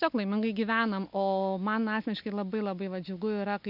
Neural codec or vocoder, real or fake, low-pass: none; real; 5.4 kHz